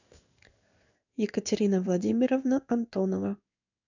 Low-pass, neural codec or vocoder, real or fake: 7.2 kHz; codec, 16 kHz in and 24 kHz out, 1 kbps, XY-Tokenizer; fake